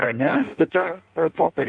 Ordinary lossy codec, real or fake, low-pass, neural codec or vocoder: AAC, 64 kbps; fake; 9.9 kHz; codec, 16 kHz in and 24 kHz out, 1.1 kbps, FireRedTTS-2 codec